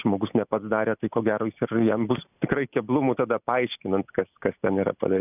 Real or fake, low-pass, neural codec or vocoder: real; 3.6 kHz; none